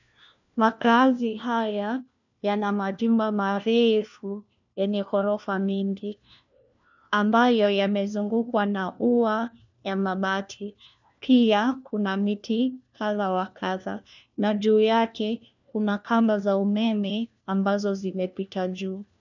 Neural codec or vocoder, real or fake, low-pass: codec, 16 kHz, 1 kbps, FunCodec, trained on LibriTTS, 50 frames a second; fake; 7.2 kHz